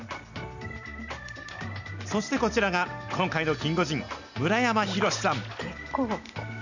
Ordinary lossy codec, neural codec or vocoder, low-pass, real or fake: none; none; 7.2 kHz; real